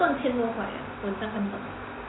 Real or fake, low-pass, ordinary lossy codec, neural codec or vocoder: real; 7.2 kHz; AAC, 16 kbps; none